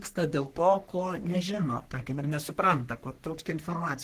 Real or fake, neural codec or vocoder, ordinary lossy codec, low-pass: fake; codec, 44.1 kHz, 3.4 kbps, Pupu-Codec; Opus, 16 kbps; 14.4 kHz